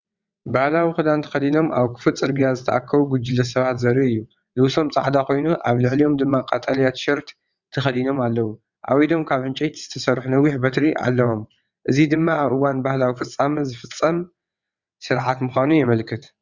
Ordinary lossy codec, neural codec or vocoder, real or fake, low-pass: Opus, 64 kbps; vocoder, 22.05 kHz, 80 mel bands, WaveNeXt; fake; 7.2 kHz